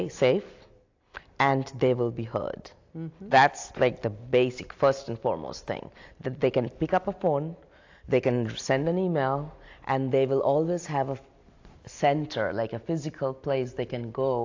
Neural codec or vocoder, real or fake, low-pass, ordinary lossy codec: none; real; 7.2 kHz; AAC, 48 kbps